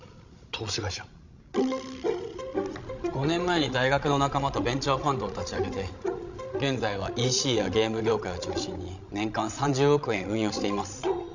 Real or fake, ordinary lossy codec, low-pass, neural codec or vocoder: fake; none; 7.2 kHz; codec, 16 kHz, 16 kbps, FreqCodec, larger model